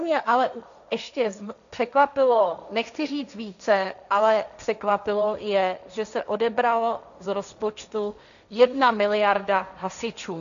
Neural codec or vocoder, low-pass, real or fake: codec, 16 kHz, 1.1 kbps, Voila-Tokenizer; 7.2 kHz; fake